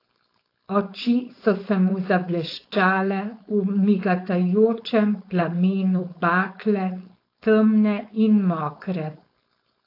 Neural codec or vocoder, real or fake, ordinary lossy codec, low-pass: codec, 16 kHz, 4.8 kbps, FACodec; fake; AAC, 32 kbps; 5.4 kHz